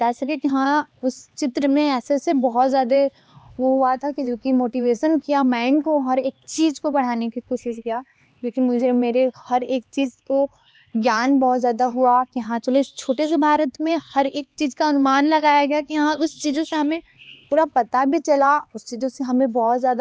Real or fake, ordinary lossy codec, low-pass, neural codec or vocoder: fake; none; none; codec, 16 kHz, 2 kbps, X-Codec, HuBERT features, trained on LibriSpeech